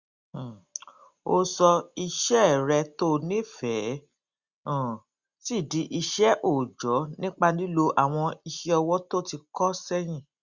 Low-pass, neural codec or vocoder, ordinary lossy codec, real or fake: 7.2 kHz; none; Opus, 64 kbps; real